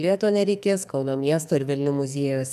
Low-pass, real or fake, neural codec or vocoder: 14.4 kHz; fake; codec, 44.1 kHz, 2.6 kbps, SNAC